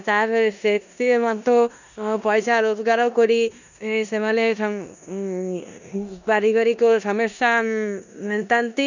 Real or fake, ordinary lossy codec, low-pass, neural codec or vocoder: fake; none; 7.2 kHz; codec, 16 kHz in and 24 kHz out, 0.9 kbps, LongCat-Audio-Codec, four codebook decoder